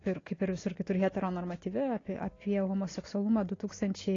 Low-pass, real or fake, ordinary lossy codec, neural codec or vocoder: 7.2 kHz; real; AAC, 32 kbps; none